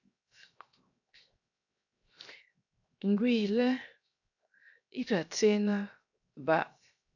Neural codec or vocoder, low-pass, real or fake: codec, 16 kHz, 0.7 kbps, FocalCodec; 7.2 kHz; fake